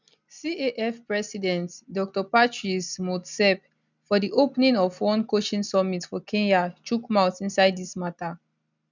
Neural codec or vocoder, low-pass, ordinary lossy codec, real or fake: none; 7.2 kHz; none; real